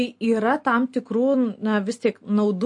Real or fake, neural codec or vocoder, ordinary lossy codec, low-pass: real; none; MP3, 48 kbps; 10.8 kHz